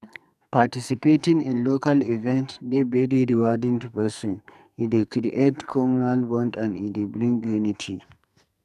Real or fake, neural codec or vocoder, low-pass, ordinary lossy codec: fake; codec, 44.1 kHz, 2.6 kbps, SNAC; 14.4 kHz; none